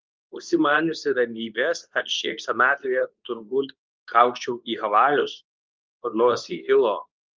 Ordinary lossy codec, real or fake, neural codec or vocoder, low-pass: Opus, 24 kbps; fake; codec, 24 kHz, 0.9 kbps, WavTokenizer, medium speech release version 2; 7.2 kHz